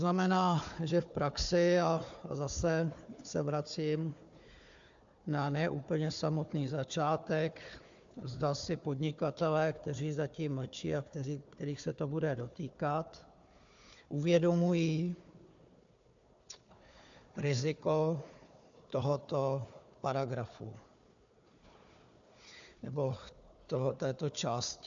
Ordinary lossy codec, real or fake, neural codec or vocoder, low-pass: AAC, 64 kbps; fake; codec, 16 kHz, 4 kbps, FunCodec, trained on Chinese and English, 50 frames a second; 7.2 kHz